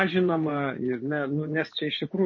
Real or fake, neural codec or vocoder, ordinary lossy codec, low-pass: fake; vocoder, 24 kHz, 100 mel bands, Vocos; MP3, 32 kbps; 7.2 kHz